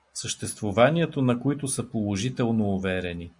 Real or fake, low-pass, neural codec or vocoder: real; 10.8 kHz; none